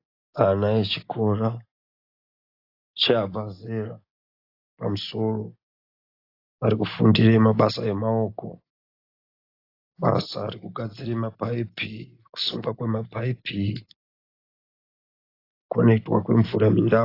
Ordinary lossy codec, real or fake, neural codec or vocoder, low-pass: AAC, 32 kbps; real; none; 5.4 kHz